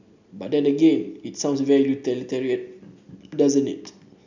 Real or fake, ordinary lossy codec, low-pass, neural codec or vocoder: real; none; 7.2 kHz; none